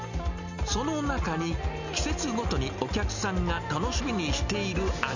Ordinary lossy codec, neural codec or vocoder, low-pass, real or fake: none; none; 7.2 kHz; real